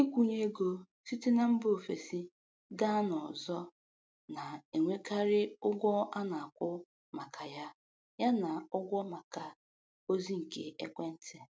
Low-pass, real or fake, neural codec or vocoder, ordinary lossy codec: none; real; none; none